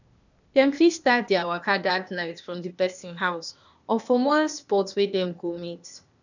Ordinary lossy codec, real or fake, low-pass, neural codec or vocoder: none; fake; 7.2 kHz; codec, 16 kHz, 0.8 kbps, ZipCodec